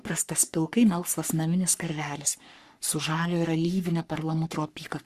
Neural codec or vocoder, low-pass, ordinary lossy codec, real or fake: codec, 44.1 kHz, 3.4 kbps, Pupu-Codec; 14.4 kHz; Opus, 64 kbps; fake